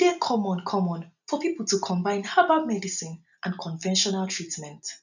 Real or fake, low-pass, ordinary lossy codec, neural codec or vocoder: real; 7.2 kHz; none; none